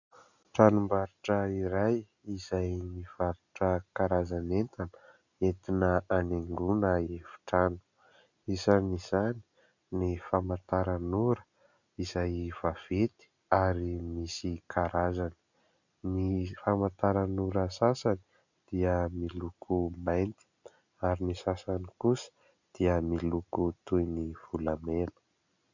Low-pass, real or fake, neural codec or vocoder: 7.2 kHz; real; none